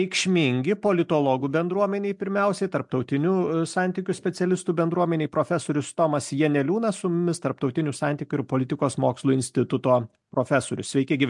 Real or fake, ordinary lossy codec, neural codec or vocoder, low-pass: real; MP3, 64 kbps; none; 10.8 kHz